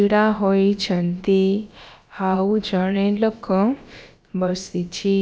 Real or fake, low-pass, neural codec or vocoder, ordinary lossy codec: fake; none; codec, 16 kHz, about 1 kbps, DyCAST, with the encoder's durations; none